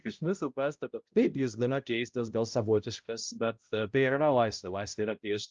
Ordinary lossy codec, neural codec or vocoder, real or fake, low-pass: Opus, 24 kbps; codec, 16 kHz, 0.5 kbps, X-Codec, HuBERT features, trained on balanced general audio; fake; 7.2 kHz